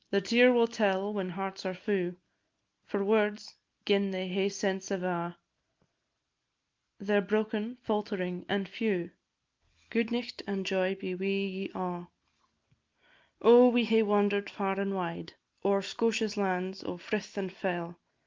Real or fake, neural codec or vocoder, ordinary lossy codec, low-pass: real; none; Opus, 32 kbps; 7.2 kHz